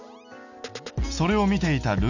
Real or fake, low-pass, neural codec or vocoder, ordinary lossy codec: real; 7.2 kHz; none; none